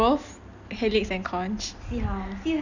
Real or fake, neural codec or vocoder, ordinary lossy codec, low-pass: real; none; none; 7.2 kHz